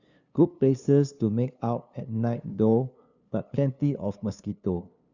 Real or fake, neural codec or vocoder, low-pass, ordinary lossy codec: fake; codec, 16 kHz, 2 kbps, FunCodec, trained on LibriTTS, 25 frames a second; 7.2 kHz; none